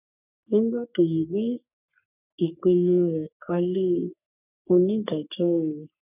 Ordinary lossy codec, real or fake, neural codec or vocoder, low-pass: none; fake; codec, 44.1 kHz, 3.4 kbps, Pupu-Codec; 3.6 kHz